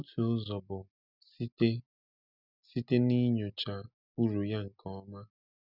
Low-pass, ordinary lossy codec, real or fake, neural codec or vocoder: 5.4 kHz; none; real; none